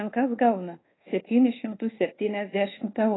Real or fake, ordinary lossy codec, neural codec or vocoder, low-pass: fake; AAC, 16 kbps; codec, 24 kHz, 1.2 kbps, DualCodec; 7.2 kHz